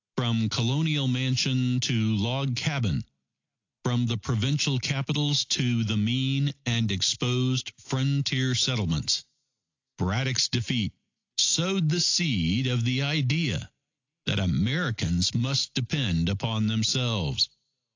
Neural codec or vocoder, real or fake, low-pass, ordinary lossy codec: none; real; 7.2 kHz; AAC, 48 kbps